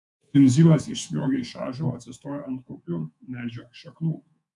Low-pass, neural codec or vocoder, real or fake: 10.8 kHz; codec, 24 kHz, 3.1 kbps, DualCodec; fake